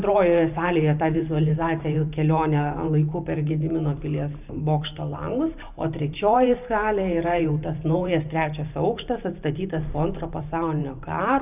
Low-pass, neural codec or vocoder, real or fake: 3.6 kHz; vocoder, 44.1 kHz, 128 mel bands every 512 samples, BigVGAN v2; fake